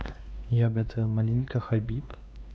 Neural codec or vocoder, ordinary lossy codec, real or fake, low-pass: codec, 16 kHz, 2 kbps, X-Codec, WavLM features, trained on Multilingual LibriSpeech; none; fake; none